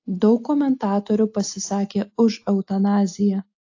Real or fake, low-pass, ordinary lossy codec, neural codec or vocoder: real; 7.2 kHz; AAC, 48 kbps; none